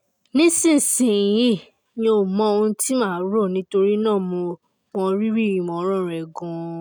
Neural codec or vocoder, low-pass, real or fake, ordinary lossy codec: none; none; real; none